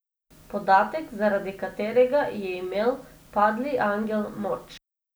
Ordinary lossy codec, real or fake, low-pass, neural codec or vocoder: none; real; none; none